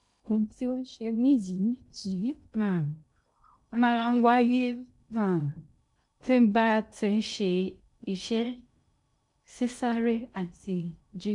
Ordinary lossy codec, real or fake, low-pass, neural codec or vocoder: none; fake; 10.8 kHz; codec, 16 kHz in and 24 kHz out, 0.6 kbps, FocalCodec, streaming, 2048 codes